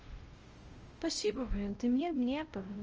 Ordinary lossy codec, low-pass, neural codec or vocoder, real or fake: Opus, 24 kbps; 7.2 kHz; codec, 16 kHz, 0.5 kbps, X-Codec, WavLM features, trained on Multilingual LibriSpeech; fake